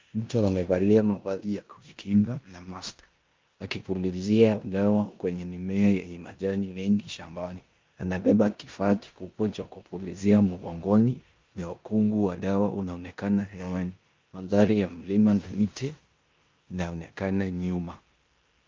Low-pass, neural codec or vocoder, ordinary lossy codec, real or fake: 7.2 kHz; codec, 16 kHz in and 24 kHz out, 0.9 kbps, LongCat-Audio-Codec, four codebook decoder; Opus, 24 kbps; fake